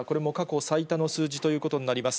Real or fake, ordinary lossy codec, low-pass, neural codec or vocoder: real; none; none; none